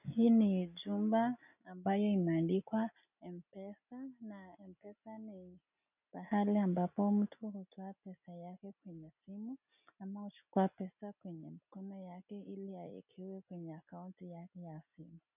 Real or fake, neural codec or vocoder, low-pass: real; none; 3.6 kHz